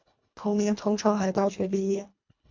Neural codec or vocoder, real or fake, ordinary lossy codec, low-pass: codec, 24 kHz, 1.5 kbps, HILCodec; fake; MP3, 48 kbps; 7.2 kHz